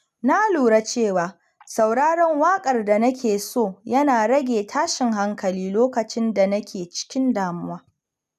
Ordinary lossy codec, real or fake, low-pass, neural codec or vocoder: none; real; 14.4 kHz; none